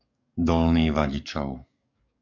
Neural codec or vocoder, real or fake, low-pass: codec, 44.1 kHz, 7.8 kbps, DAC; fake; 7.2 kHz